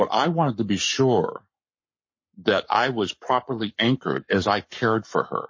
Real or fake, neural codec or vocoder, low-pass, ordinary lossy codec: fake; codec, 44.1 kHz, 7.8 kbps, Pupu-Codec; 7.2 kHz; MP3, 32 kbps